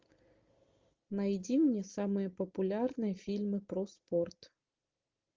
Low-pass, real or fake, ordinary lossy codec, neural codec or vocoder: 7.2 kHz; real; Opus, 32 kbps; none